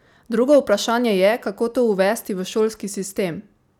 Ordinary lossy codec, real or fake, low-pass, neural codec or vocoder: none; real; 19.8 kHz; none